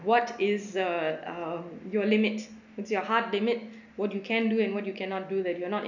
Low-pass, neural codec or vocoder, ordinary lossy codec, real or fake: 7.2 kHz; none; none; real